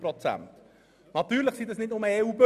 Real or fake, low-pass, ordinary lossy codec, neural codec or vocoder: real; 14.4 kHz; none; none